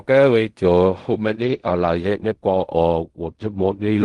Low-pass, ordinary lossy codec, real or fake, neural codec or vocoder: 10.8 kHz; Opus, 24 kbps; fake; codec, 16 kHz in and 24 kHz out, 0.4 kbps, LongCat-Audio-Codec, fine tuned four codebook decoder